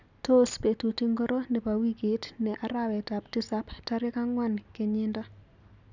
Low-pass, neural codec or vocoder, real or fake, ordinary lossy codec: 7.2 kHz; none; real; none